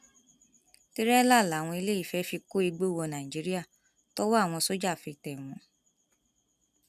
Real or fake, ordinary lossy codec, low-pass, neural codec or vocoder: real; none; 14.4 kHz; none